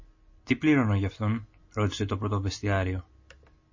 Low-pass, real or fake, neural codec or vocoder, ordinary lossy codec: 7.2 kHz; real; none; MP3, 32 kbps